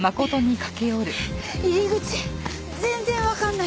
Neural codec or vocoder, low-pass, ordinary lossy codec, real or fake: none; none; none; real